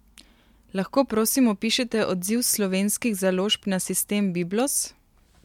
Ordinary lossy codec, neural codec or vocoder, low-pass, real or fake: MP3, 96 kbps; none; 19.8 kHz; real